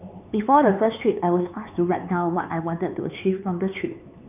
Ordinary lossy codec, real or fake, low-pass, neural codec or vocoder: none; fake; 3.6 kHz; codec, 16 kHz, 4 kbps, X-Codec, HuBERT features, trained on LibriSpeech